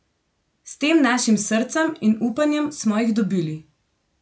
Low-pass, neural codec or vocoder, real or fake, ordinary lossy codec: none; none; real; none